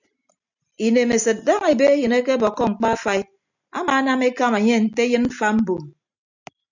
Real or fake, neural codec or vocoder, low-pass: real; none; 7.2 kHz